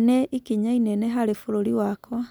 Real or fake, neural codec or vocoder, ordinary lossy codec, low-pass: real; none; none; none